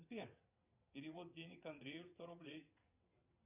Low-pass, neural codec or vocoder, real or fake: 3.6 kHz; vocoder, 22.05 kHz, 80 mel bands, WaveNeXt; fake